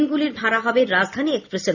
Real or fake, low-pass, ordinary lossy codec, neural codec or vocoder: real; 7.2 kHz; none; none